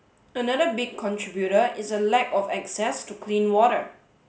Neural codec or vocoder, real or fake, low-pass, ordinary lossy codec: none; real; none; none